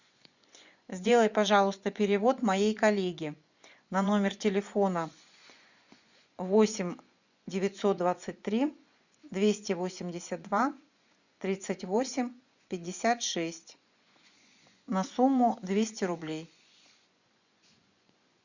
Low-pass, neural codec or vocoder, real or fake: 7.2 kHz; vocoder, 24 kHz, 100 mel bands, Vocos; fake